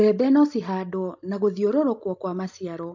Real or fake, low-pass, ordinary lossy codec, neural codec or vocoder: real; 7.2 kHz; MP3, 48 kbps; none